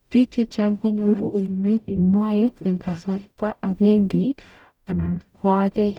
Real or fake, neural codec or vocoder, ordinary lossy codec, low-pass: fake; codec, 44.1 kHz, 0.9 kbps, DAC; none; 19.8 kHz